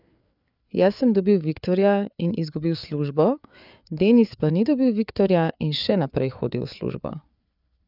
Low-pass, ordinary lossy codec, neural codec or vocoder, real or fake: 5.4 kHz; none; codec, 16 kHz, 4 kbps, FreqCodec, larger model; fake